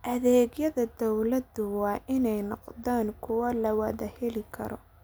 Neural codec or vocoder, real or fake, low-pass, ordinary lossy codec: none; real; none; none